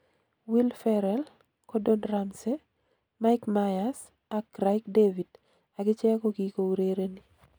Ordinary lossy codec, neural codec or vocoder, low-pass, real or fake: none; none; none; real